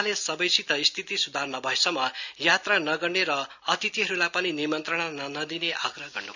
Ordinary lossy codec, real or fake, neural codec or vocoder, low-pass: none; real; none; 7.2 kHz